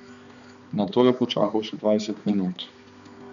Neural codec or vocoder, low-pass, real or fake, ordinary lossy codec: codec, 16 kHz, 2 kbps, X-Codec, HuBERT features, trained on balanced general audio; 7.2 kHz; fake; none